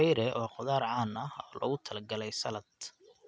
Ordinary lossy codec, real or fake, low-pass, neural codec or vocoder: none; real; none; none